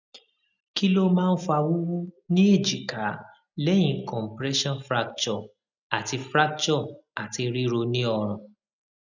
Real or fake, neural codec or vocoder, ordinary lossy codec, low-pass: real; none; none; 7.2 kHz